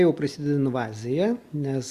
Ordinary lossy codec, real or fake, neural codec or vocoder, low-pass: Opus, 64 kbps; real; none; 14.4 kHz